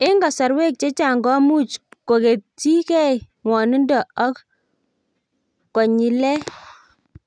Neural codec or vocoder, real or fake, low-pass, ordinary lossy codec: none; real; 9.9 kHz; none